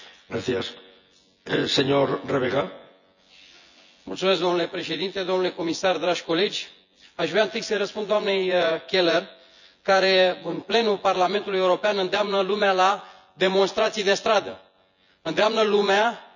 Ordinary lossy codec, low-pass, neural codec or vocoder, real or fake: none; 7.2 kHz; vocoder, 24 kHz, 100 mel bands, Vocos; fake